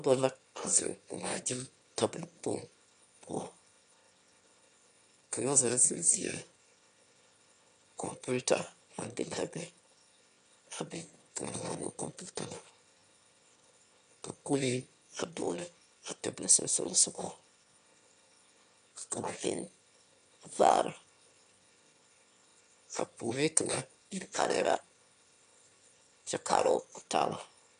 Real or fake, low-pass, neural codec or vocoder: fake; 9.9 kHz; autoencoder, 22.05 kHz, a latent of 192 numbers a frame, VITS, trained on one speaker